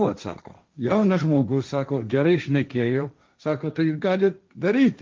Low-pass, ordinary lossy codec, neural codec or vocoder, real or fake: 7.2 kHz; Opus, 24 kbps; codec, 16 kHz, 1.1 kbps, Voila-Tokenizer; fake